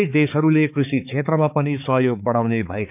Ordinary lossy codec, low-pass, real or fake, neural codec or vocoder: none; 3.6 kHz; fake; codec, 16 kHz, 4 kbps, X-Codec, HuBERT features, trained on balanced general audio